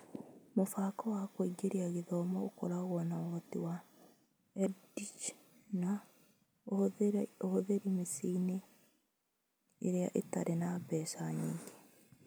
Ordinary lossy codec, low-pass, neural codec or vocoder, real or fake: none; none; none; real